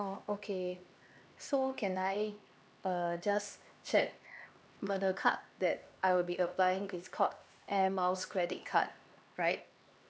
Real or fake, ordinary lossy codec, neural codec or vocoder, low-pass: fake; none; codec, 16 kHz, 2 kbps, X-Codec, HuBERT features, trained on LibriSpeech; none